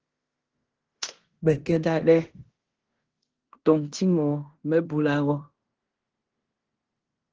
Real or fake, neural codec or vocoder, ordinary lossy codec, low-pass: fake; codec, 16 kHz in and 24 kHz out, 0.9 kbps, LongCat-Audio-Codec, fine tuned four codebook decoder; Opus, 16 kbps; 7.2 kHz